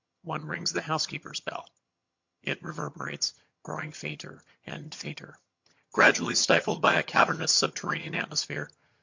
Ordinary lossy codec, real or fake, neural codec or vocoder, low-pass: MP3, 48 kbps; fake; vocoder, 22.05 kHz, 80 mel bands, HiFi-GAN; 7.2 kHz